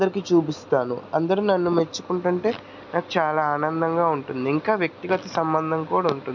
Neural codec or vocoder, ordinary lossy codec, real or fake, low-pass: none; none; real; 7.2 kHz